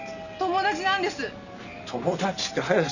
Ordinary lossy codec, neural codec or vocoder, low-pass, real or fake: none; none; 7.2 kHz; real